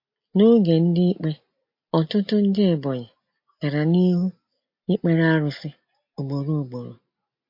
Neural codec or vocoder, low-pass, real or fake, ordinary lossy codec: none; 5.4 kHz; real; MP3, 32 kbps